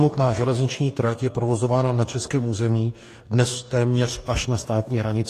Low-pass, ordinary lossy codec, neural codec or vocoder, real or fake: 14.4 kHz; AAC, 48 kbps; codec, 44.1 kHz, 2.6 kbps, DAC; fake